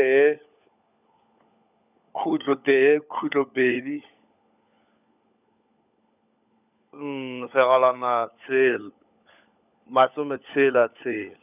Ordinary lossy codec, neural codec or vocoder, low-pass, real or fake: none; codec, 16 kHz, 16 kbps, FunCodec, trained on LibriTTS, 50 frames a second; 3.6 kHz; fake